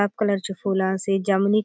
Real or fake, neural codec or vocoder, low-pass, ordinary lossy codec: real; none; none; none